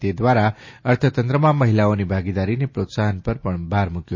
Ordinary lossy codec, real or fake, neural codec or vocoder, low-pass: MP3, 32 kbps; real; none; 7.2 kHz